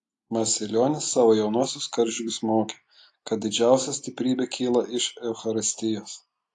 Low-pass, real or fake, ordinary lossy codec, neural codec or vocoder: 10.8 kHz; real; AAC, 48 kbps; none